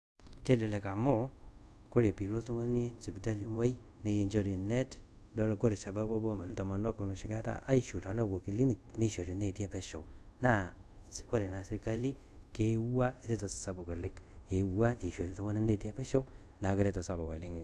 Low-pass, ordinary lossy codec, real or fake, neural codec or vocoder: none; none; fake; codec, 24 kHz, 0.5 kbps, DualCodec